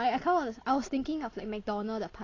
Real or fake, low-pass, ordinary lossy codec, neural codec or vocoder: real; 7.2 kHz; AAC, 32 kbps; none